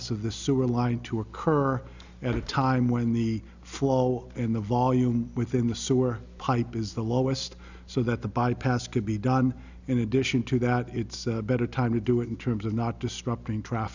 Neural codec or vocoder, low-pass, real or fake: none; 7.2 kHz; real